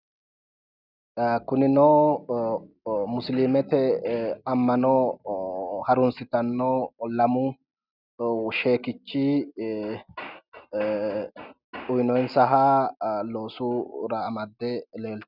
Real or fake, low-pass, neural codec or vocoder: real; 5.4 kHz; none